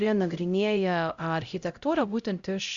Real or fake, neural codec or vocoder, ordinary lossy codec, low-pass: fake; codec, 16 kHz, 0.5 kbps, X-Codec, HuBERT features, trained on LibriSpeech; Opus, 64 kbps; 7.2 kHz